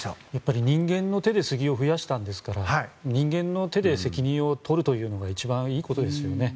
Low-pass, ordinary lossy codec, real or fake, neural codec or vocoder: none; none; real; none